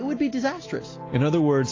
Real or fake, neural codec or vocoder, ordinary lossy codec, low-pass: real; none; AAC, 32 kbps; 7.2 kHz